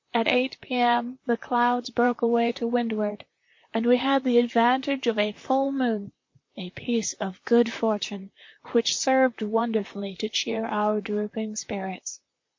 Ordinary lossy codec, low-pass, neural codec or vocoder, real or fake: MP3, 48 kbps; 7.2 kHz; vocoder, 44.1 kHz, 128 mel bands, Pupu-Vocoder; fake